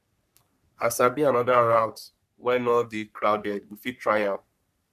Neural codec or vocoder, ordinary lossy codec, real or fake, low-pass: codec, 44.1 kHz, 3.4 kbps, Pupu-Codec; none; fake; 14.4 kHz